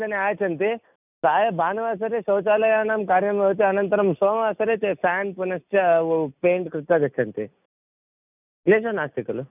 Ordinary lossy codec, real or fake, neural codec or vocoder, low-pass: none; real; none; 3.6 kHz